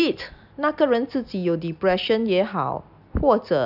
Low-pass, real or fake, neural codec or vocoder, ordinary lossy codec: 5.4 kHz; real; none; none